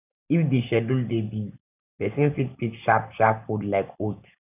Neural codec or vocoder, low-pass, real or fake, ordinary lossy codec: none; 3.6 kHz; real; none